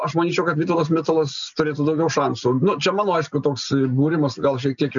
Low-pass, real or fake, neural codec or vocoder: 7.2 kHz; real; none